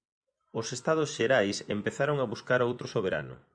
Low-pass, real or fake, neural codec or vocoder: 9.9 kHz; fake; vocoder, 24 kHz, 100 mel bands, Vocos